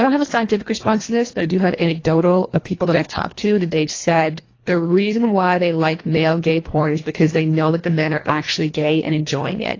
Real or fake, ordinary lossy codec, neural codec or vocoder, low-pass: fake; AAC, 32 kbps; codec, 24 kHz, 1.5 kbps, HILCodec; 7.2 kHz